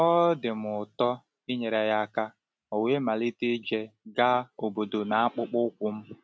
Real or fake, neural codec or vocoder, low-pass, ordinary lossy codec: real; none; none; none